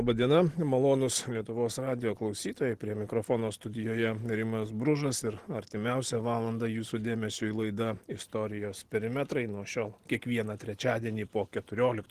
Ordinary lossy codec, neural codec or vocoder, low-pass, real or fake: Opus, 16 kbps; none; 14.4 kHz; real